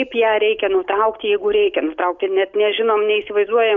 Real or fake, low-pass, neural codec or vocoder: real; 7.2 kHz; none